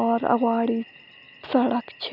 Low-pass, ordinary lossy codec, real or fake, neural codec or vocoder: 5.4 kHz; none; real; none